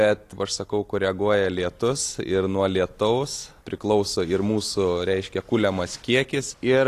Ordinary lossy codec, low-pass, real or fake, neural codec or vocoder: AAC, 48 kbps; 14.4 kHz; real; none